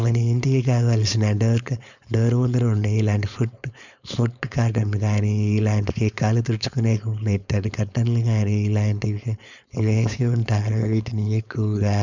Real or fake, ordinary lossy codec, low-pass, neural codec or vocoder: fake; none; 7.2 kHz; codec, 16 kHz, 4.8 kbps, FACodec